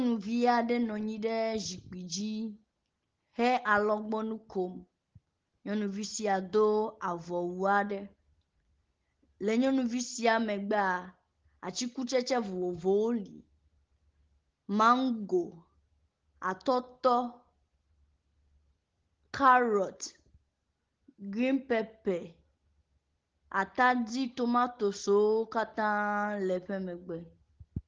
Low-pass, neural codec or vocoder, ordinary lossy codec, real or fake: 7.2 kHz; none; Opus, 16 kbps; real